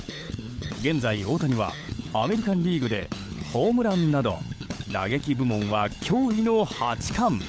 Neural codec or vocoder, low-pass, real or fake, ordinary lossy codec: codec, 16 kHz, 16 kbps, FunCodec, trained on LibriTTS, 50 frames a second; none; fake; none